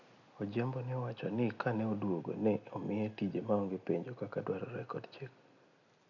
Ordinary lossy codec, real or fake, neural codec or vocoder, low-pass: none; real; none; 7.2 kHz